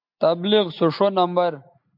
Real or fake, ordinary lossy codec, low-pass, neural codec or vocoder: real; MP3, 48 kbps; 5.4 kHz; none